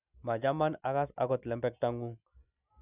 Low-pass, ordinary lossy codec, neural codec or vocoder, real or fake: 3.6 kHz; none; none; real